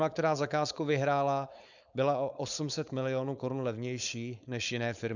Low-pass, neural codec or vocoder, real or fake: 7.2 kHz; codec, 16 kHz, 4.8 kbps, FACodec; fake